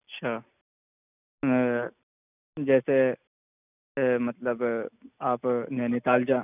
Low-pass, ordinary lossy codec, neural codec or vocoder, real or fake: 3.6 kHz; none; none; real